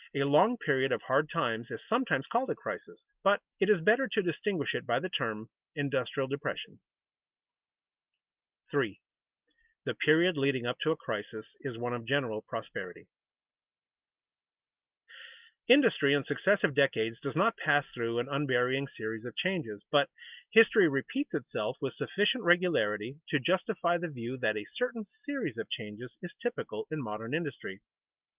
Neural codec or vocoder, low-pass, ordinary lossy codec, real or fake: none; 3.6 kHz; Opus, 24 kbps; real